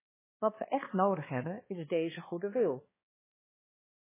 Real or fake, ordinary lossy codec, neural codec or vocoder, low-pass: fake; MP3, 16 kbps; codec, 16 kHz, 2 kbps, X-Codec, HuBERT features, trained on balanced general audio; 3.6 kHz